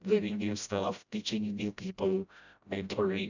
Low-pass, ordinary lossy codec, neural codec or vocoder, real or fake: 7.2 kHz; none; codec, 16 kHz, 0.5 kbps, FreqCodec, smaller model; fake